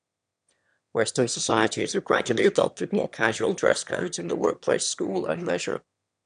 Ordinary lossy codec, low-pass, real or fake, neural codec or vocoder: none; 9.9 kHz; fake; autoencoder, 22.05 kHz, a latent of 192 numbers a frame, VITS, trained on one speaker